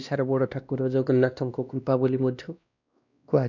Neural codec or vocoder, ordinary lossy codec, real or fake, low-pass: codec, 16 kHz, 1 kbps, X-Codec, WavLM features, trained on Multilingual LibriSpeech; none; fake; 7.2 kHz